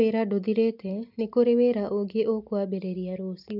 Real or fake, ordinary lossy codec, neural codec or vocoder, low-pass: real; none; none; 5.4 kHz